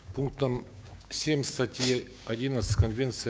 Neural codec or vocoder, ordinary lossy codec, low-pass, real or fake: codec, 16 kHz, 6 kbps, DAC; none; none; fake